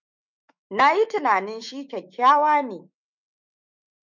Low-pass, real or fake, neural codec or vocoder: 7.2 kHz; fake; autoencoder, 48 kHz, 128 numbers a frame, DAC-VAE, trained on Japanese speech